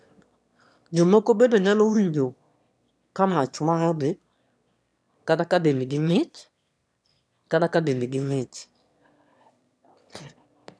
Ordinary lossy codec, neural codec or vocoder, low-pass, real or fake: none; autoencoder, 22.05 kHz, a latent of 192 numbers a frame, VITS, trained on one speaker; none; fake